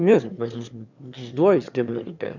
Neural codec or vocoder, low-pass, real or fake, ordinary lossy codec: autoencoder, 22.05 kHz, a latent of 192 numbers a frame, VITS, trained on one speaker; 7.2 kHz; fake; none